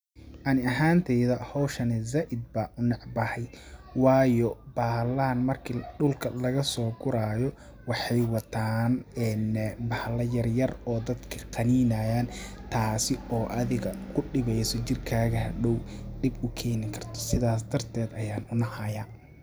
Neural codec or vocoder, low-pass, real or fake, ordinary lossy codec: none; none; real; none